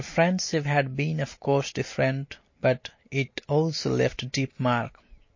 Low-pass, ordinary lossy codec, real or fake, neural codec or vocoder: 7.2 kHz; MP3, 32 kbps; real; none